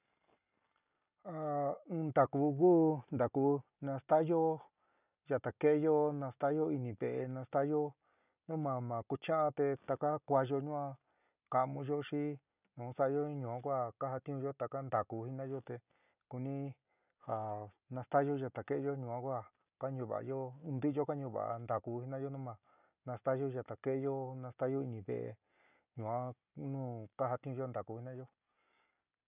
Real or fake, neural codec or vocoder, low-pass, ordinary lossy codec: real; none; 3.6 kHz; none